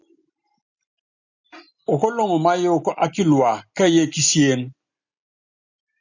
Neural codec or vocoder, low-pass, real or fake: none; 7.2 kHz; real